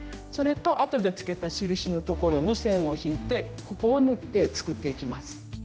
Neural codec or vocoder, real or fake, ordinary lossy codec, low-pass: codec, 16 kHz, 1 kbps, X-Codec, HuBERT features, trained on general audio; fake; none; none